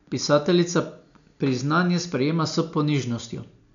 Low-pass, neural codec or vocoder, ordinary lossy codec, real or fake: 7.2 kHz; none; none; real